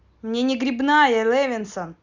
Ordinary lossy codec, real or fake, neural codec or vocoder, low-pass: Opus, 64 kbps; real; none; 7.2 kHz